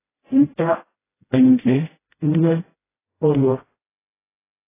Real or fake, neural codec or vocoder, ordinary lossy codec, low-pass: fake; codec, 16 kHz, 0.5 kbps, FreqCodec, smaller model; AAC, 16 kbps; 3.6 kHz